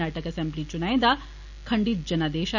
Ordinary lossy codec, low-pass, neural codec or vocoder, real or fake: none; 7.2 kHz; none; real